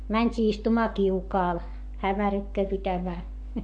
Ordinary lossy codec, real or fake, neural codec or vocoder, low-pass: none; fake; codec, 44.1 kHz, 7.8 kbps, Pupu-Codec; 9.9 kHz